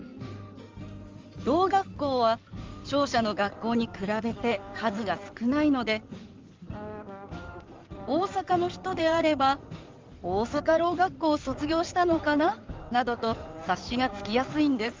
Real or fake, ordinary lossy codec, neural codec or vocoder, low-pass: fake; Opus, 32 kbps; codec, 16 kHz in and 24 kHz out, 2.2 kbps, FireRedTTS-2 codec; 7.2 kHz